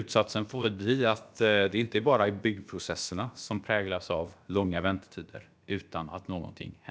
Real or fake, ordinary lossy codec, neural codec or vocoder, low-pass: fake; none; codec, 16 kHz, about 1 kbps, DyCAST, with the encoder's durations; none